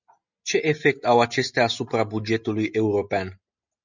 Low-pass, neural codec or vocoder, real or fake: 7.2 kHz; none; real